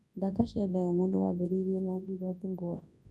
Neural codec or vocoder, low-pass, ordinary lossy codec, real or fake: codec, 24 kHz, 0.9 kbps, WavTokenizer, large speech release; none; none; fake